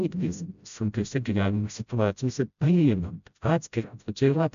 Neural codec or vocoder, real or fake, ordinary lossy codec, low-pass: codec, 16 kHz, 0.5 kbps, FreqCodec, smaller model; fake; MP3, 96 kbps; 7.2 kHz